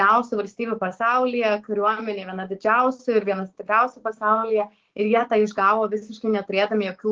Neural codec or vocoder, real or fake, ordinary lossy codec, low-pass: codec, 16 kHz, 6 kbps, DAC; fake; Opus, 16 kbps; 7.2 kHz